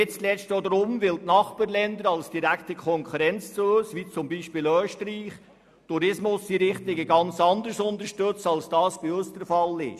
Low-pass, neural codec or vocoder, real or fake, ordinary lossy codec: 14.4 kHz; none; real; none